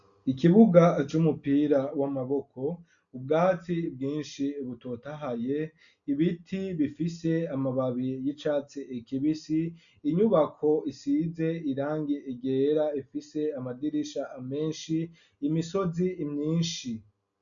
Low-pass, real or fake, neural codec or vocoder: 7.2 kHz; real; none